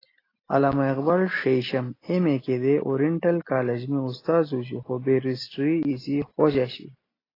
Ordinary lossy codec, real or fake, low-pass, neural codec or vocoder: AAC, 24 kbps; real; 5.4 kHz; none